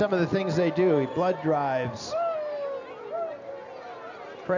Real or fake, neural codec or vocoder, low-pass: real; none; 7.2 kHz